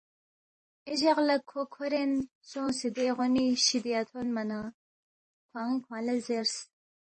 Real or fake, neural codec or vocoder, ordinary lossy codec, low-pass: fake; vocoder, 44.1 kHz, 128 mel bands every 512 samples, BigVGAN v2; MP3, 32 kbps; 10.8 kHz